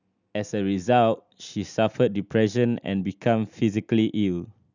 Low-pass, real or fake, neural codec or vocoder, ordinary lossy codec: 7.2 kHz; real; none; none